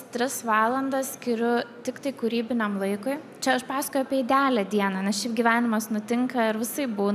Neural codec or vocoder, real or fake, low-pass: none; real; 14.4 kHz